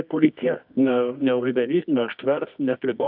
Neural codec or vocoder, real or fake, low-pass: codec, 24 kHz, 0.9 kbps, WavTokenizer, medium music audio release; fake; 5.4 kHz